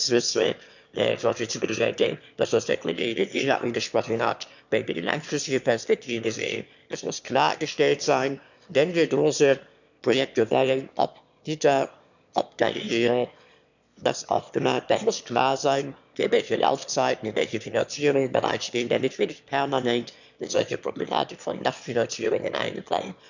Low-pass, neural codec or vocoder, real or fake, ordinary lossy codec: 7.2 kHz; autoencoder, 22.05 kHz, a latent of 192 numbers a frame, VITS, trained on one speaker; fake; none